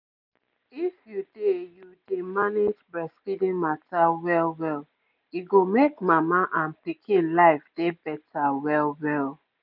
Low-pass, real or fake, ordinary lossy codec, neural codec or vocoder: 5.4 kHz; real; none; none